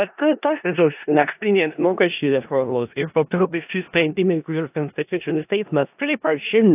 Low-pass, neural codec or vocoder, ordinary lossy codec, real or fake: 3.6 kHz; codec, 16 kHz in and 24 kHz out, 0.4 kbps, LongCat-Audio-Codec, four codebook decoder; AAC, 32 kbps; fake